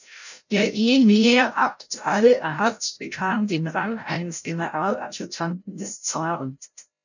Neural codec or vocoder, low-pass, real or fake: codec, 16 kHz, 0.5 kbps, FreqCodec, larger model; 7.2 kHz; fake